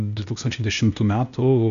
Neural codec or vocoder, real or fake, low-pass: codec, 16 kHz, 0.8 kbps, ZipCodec; fake; 7.2 kHz